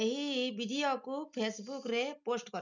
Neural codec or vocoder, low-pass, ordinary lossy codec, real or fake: none; 7.2 kHz; none; real